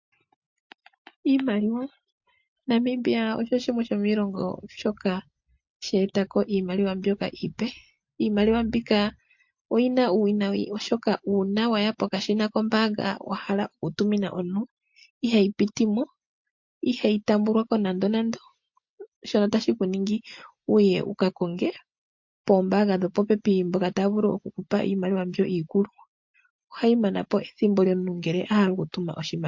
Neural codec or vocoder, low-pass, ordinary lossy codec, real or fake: none; 7.2 kHz; MP3, 48 kbps; real